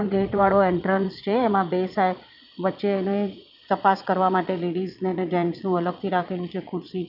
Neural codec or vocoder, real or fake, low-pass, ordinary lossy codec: vocoder, 22.05 kHz, 80 mel bands, Vocos; fake; 5.4 kHz; none